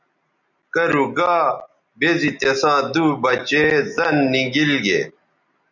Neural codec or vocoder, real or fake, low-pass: none; real; 7.2 kHz